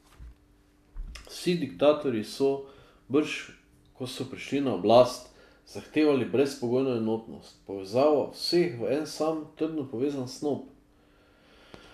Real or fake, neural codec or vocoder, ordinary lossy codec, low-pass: real; none; MP3, 96 kbps; 14.4 kHz